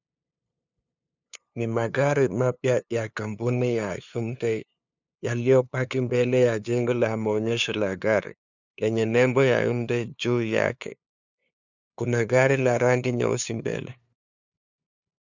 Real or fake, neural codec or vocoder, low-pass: fake; codec, 16 kHz, 2 kbps, FunCodec, trained on LibriTTS, 25 frames a second; 7.2 kHz